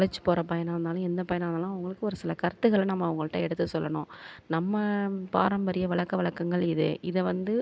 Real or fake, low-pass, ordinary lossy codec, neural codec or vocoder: real; none; none; none